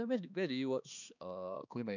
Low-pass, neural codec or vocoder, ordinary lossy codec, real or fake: 7.2 kHz; codec, 16 kHz, 2 kbps, X-Codec, HuBERT features, trained on balanced general audio; none; fake